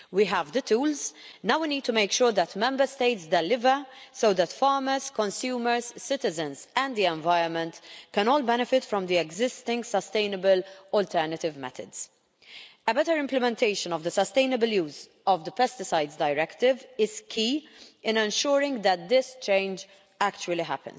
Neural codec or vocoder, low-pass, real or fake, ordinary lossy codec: none; none; real; none